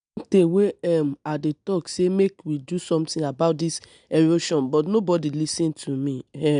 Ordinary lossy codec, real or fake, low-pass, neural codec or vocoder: MP3, 96 kbps; real; 9.9 kHz; none